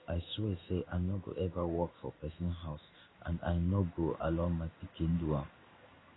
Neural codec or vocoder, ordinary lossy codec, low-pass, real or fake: codec, 16 kHz in and 24 kHz out, 1 kbps, XY-Tokenizer; AAC, 16 kbps; 7.2 kHz; fake